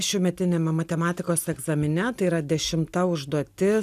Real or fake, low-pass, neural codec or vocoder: real; 14.4 kHz; none